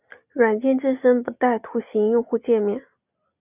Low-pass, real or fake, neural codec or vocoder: 3.6 kHz; real; none